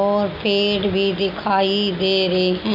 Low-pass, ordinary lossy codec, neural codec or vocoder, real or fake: 5.4 kHz; none; none; real